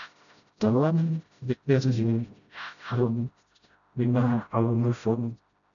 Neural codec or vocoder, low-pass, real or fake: codec, 16 kHz, 0.5 kbps, FreqCodec, smaller model; 7.2 kHz; fake